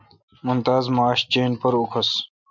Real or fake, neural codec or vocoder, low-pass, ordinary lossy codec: real; none; 7.2 kHz; MP3, 64 kbps